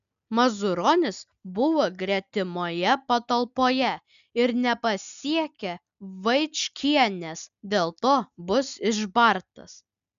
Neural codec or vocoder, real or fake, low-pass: none; real; 7.2 kHz